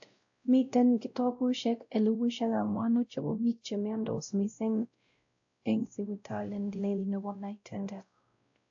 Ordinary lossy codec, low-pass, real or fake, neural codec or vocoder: AAC, 64 kbps; 7.2 kHz; fake; codec, 16 kHz, 0.5 kbps, X-Codec, WavLM features, trained on Multilingual LibriSpeech